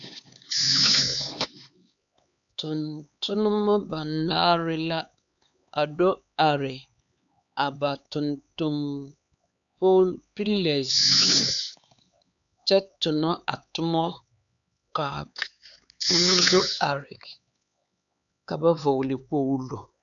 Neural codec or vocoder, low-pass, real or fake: codec, 16 kHz, 4 kbps, X-Codec, HuBERT features, trained on LibriSpeech; 7.2 kHz; fake